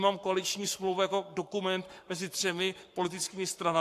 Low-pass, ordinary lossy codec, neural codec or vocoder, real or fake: 14.4 kHz; AAC, 64 kbps; codec, 44.1 kHz, 7.8 kbps, Pupu-Codec; fake